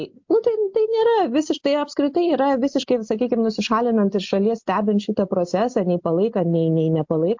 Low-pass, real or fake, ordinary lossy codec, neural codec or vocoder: 7.2 kHz; real; MP3, 48 kbps; none